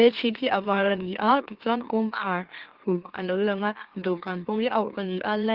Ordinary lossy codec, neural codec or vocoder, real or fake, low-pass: Opus, 16 kbps; autoencoder, 44.1 kHz, a latent of 192 numbers a frame, MeloTTS; fake; 5.4 kHz